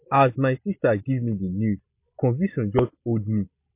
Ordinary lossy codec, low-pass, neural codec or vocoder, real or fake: MP3, 32 kbps; 3.6 kHz; none; real